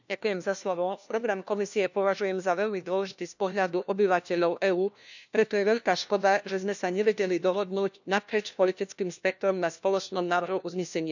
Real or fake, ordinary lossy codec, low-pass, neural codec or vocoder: fake; none; 7.2 kHz; codec, 16 kHz, 1 kbps, FunCodec, trained on LibriTTS, 50 frames a second